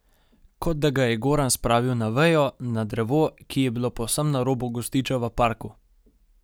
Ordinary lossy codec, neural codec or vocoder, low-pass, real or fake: none; none; none; real